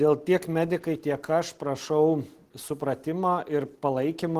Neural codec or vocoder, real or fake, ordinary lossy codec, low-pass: none; real; Opus, 16 kbps; 14.4 kHz